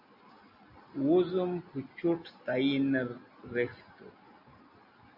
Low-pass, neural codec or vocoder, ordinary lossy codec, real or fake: 5.4 kHz; none; AAC, 48 kbps; real